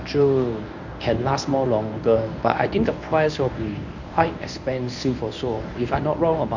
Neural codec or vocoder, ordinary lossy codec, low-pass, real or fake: codec, 24 kHz, 0.9 kbps, WavTokenizer, medium speech release version 1; none; 7.2 kHz; fake